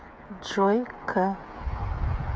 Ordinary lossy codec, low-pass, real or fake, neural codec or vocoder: none; none; fake; codec, 16 kHz, 8 kbps, FreqCodec, smaller model